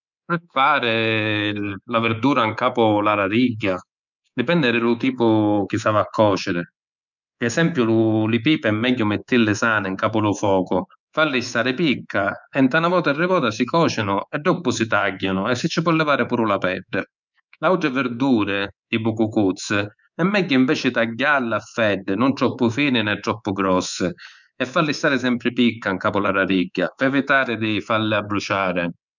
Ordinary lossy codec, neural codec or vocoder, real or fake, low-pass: none; codec, 24 kHz, 3.1 kbps, DualCodec; fake; 7.2 kHz